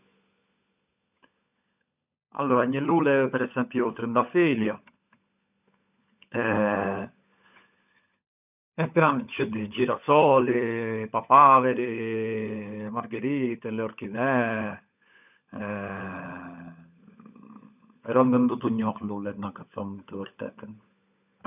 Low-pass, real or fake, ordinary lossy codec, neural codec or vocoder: 3.6 kHz; fake; none; codec, 16 kHz, 16 kbps, FunCodec, trained on LibriTTS, 50 frames a second